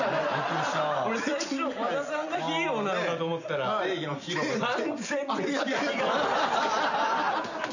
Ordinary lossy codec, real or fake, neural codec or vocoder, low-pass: none; real; none; 7.2 kHz